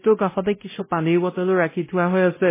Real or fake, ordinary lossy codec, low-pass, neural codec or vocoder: fake; MP3, 16 kbps; 3.6 kHz; codec, 16 kHz, 0.5 kbps, X-Codec, WavLM features, trained on Multilingual LibriSpeech